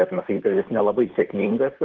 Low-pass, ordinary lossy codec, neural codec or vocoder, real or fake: 7.2 kHz; Opus, 16 kbps; vocoder, 44.1 kHz, 128 mel bands, Pupu-Vocoder; fake